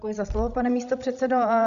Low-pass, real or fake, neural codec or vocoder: 7.2 kHz; fake; codec, 16 kHz, 16 kbps, FreqCodec, larger model